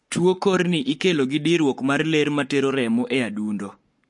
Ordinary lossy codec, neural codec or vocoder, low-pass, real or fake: MP3, 48 kbps; codec, 44.1 kHz, 7.8 kbps, Pupu-Codec; 10.8 kHz; fake